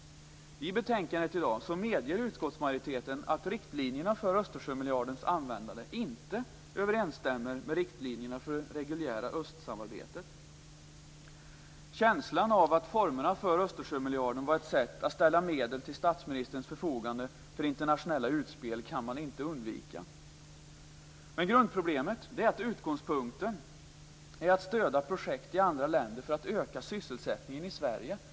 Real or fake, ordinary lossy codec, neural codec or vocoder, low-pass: real; none; none; none